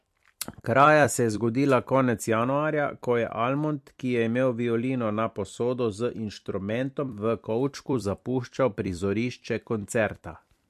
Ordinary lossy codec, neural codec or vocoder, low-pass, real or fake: MP3, 64 kbps; vocoder, 44.1 kHz, 128 mel bands every 256 samples, BigVGAN v2; 14.4 kHz; fake